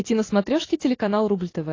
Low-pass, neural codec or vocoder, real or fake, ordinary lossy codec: 7.2 kHz; none; real; AAC, 32 kbps